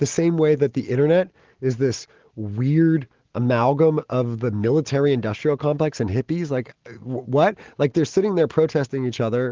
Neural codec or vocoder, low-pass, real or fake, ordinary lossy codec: none; 7.2 kHz; real; Opus, 16 kbps